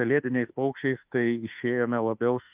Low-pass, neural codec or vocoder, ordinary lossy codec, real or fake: 3.6 kHz; autoencoder, 48 kHz, 32 numbers a frame, DAC-VAE, trained on Japanese speech; Opus, 24 kbps; fake